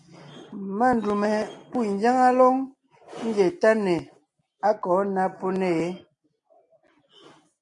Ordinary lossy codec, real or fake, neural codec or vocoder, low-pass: MP3, 48 kbps; real; none; 10.8 kHz